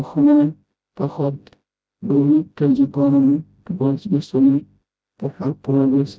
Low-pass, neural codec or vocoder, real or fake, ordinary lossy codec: none; codec, 16 kHz, 0.5 kbps, FreqCodec, smaller model; fake; none